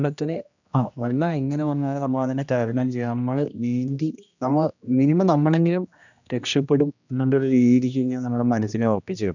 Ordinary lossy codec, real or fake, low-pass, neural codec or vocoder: none; fake; 7.2 kHz; codec, 16 kHz, 1 kbps, X-Codec, HuBERT features, trained on general audio